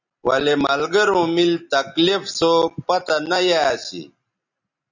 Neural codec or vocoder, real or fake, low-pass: none; real; 7.2 kHz